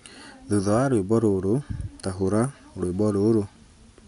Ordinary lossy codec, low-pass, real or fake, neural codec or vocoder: none; 10.8 kHz; real; none